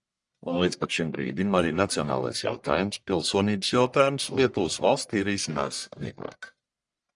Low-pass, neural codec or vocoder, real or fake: 10.8 kHz; codec, 44.1 kHz, 1.7 kbps, Pupu-Codec; fake